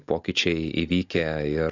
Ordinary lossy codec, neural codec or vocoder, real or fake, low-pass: AAC, 48 kbps; none; real; 7.2 kHz